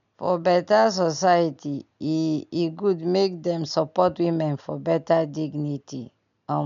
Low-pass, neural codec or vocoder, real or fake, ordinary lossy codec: 7.2 kHz; none; real; none